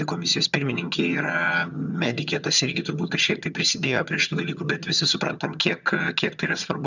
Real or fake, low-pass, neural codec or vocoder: fake; 7.2 kHz; vocoder, 22.05 kHz, 80 mel bands, HiFi-GAN